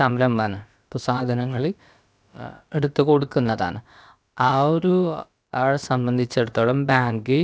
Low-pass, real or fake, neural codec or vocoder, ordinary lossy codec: none; fake; codec, 16 kHz, about 1 kbps, DyCAST, with the encoder's durations; none